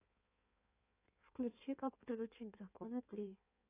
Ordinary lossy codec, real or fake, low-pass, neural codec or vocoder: AAC, 24 kbps; fake; 3.6 kHz; codec, 16 kHz in and 24 kHz out, 1.1 kbps, FireRedTTS-2 codec